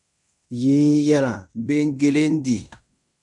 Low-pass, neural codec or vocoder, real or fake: 10.8 kHz; codec, 16 kHz in and 24 kHz out, 0.9 kbps, LongCat-Audio-Codec, fine tuned four codebook decoder; fake